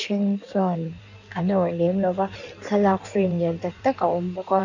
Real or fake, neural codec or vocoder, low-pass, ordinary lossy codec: fake; codec, 16 kHz in and 24 kHz out, 1.1 kbps, FireRedTTS-2 codec; 7.2 kHz; none